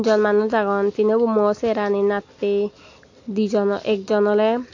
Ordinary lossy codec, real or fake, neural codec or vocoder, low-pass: AAC, 48 kbps; real; none; 7.2 kHz